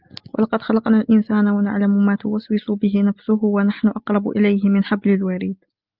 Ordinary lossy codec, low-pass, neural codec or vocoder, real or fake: Opus, 24 kbps; 5.4 kHz; none; real